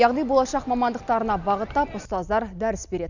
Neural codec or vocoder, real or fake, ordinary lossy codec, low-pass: none; real; none; 7.2 kHz